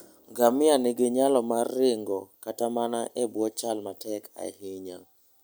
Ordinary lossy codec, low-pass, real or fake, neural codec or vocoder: none; none; real; none